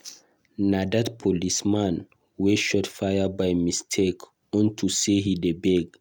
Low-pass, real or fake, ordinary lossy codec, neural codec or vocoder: 19.8 kHz; real; none; none